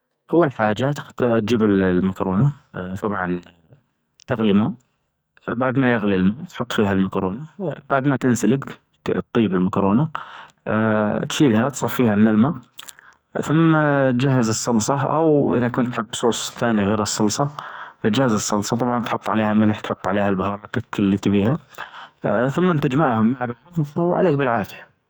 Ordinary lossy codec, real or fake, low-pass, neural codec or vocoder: none; fake; none; codec, 44.1 kHz, 2.6 kbps, SNAC